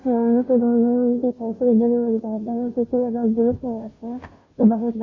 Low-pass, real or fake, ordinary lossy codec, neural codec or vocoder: 7.2 kHz; fake; MP3, 32 kbps; codec, 16 kHz, 0.5 kbps, FunCodec, trained on Chinese and English, 25 frames a second